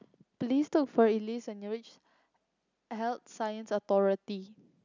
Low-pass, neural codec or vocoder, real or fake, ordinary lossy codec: 7.2 kHz; none; real; none